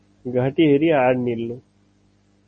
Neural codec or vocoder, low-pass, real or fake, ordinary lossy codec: none; 9.9 kHz; real; MP3, 32 kbps